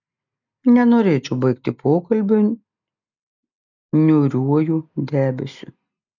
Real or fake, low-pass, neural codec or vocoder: real; 7.2 kHz; none